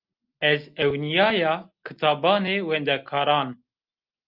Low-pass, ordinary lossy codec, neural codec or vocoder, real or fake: 5.4 kHz; Opus, 24 kbps; none; real